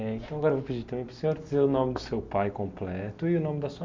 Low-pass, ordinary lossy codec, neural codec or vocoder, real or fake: 7.2 kHz; MP3, 64 kbps; none; real